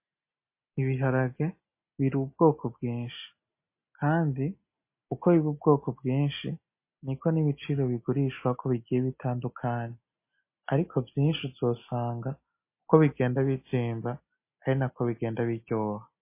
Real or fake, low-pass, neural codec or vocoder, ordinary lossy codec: real; 3.6 kHz; none; MP3, 24 kbps